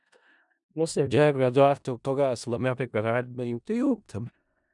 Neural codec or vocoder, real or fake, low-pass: codec, 16 kHz in and 24 kHz out, 0.4 kbps, LongCat-Audio-Codec, four codebook decoder; fake; 10.8 kHz